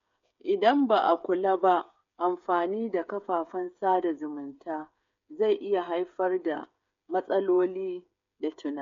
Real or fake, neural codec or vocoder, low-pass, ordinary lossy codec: fake; codec, 16 kHz, 8 kbps, FreqCodec, smaller model; 7.2 kHz; MP3, 48 kbps